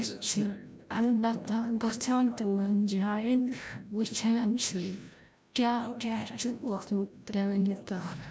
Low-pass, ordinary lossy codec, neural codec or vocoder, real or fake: none; none; codec, 16 kHz, 0.5 kbps, FreqCodec, larger model; fake